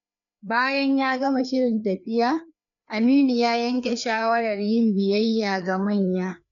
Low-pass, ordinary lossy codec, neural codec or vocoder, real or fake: 7.2 kHz; none; codec, 16 kHz, 2 kbps, FreqCodec, larger model; fake